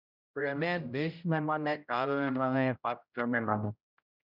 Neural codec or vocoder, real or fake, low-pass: codec, 16 kHz, 0.5 kbps, X-Codec, HuBERT features, trained on general audio; fake; 5.4 kHz